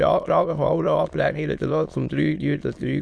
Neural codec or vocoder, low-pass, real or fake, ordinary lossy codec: autoencoder, 22.05 kHz, a latent of 192 numbers a frame, VITS, trained on many speakers; none; fake; none